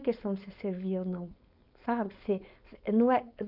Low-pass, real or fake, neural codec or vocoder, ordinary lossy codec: 5.4 kHz; fake; codec, 16 kHz, 4.8 kbps, FACodec; none